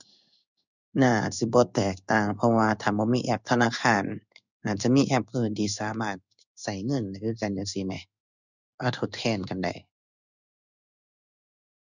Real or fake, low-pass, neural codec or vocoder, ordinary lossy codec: fake; 7.2 kHz; codec, 16 kHz in and 24 kHz out, 1 kbps, XY-Tokenizer; none